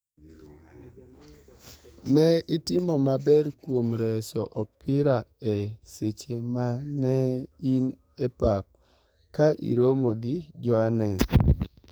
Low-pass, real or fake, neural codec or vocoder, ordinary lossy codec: none; fake; codec, 44.1 kHz, 2.6 kbps, SNAC; none